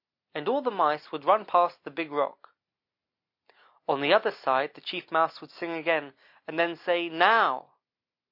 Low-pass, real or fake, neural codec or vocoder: 5.4 kHz; real; none